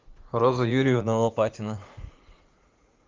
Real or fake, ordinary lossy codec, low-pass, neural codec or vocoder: fake; Opus, 24 kbps; 7.2 kHz; vocoder, 44.1 kHz, 80 mel bands, Vocos